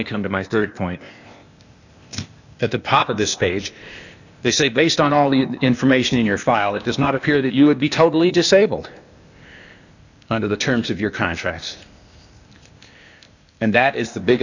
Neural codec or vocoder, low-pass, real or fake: codec, 16 kHz, 0.8 kbps, ZipCodec; 7.2 kHz; fake